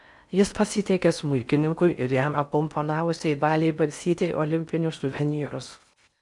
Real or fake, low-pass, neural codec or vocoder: fake; 10.8 kHz; codec, 16 kHz in and 24 kHz out, 0.6 kbps, FocalCodec, streaming, 4096 codes